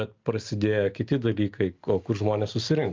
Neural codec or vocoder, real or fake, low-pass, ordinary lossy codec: none; real; 7.2 kHz; Opus, 32 kbps